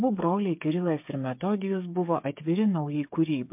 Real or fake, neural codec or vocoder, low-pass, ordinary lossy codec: fake; codec, 16 kHz, 8 kbps, FreqCodec, smaller model; 3.6 kHz; MP3, 32 kbps